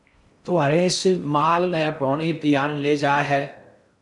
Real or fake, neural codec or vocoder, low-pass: fake; codec, 16 kHz in and 24 kHz out, 0.6 kbps, FocalCodec, streaming, 4096 codes; 10.8 kHz